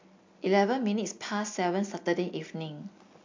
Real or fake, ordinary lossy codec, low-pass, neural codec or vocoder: real; MP3, 48 kbps; 7.2 kHz; none